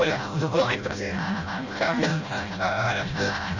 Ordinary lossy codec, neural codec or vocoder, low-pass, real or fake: Opus, 64 kbps; codec, 16 kHz, 0.5 kbps, FreqCodec, smaller model; 7.2 kHz; fake